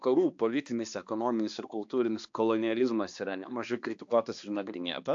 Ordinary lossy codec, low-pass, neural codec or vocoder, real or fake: AAC, 64 kbps; 7.2 kHz; codec, 16 kHz, 2 kbps, X-Codec, HuBERT features, trained on balanced general audio; fake